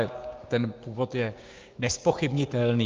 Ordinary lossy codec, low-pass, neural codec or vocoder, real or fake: Opus, 24 kbps; 7.2 kHz; codec, 16 kHz, 6 kbps, DAC; fake